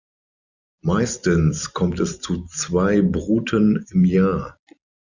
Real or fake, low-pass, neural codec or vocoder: fake; 7.2 kHz; vocoder, 44.1 kHz, 128 mel bands every 256 samples, BigVGAN v2